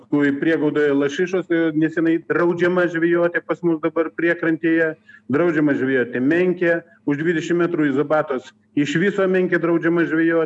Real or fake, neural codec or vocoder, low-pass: real; none; 10.8 kHz